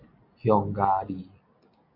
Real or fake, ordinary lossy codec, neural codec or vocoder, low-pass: real; MP3, 32 kbps; none; 5.4 kHz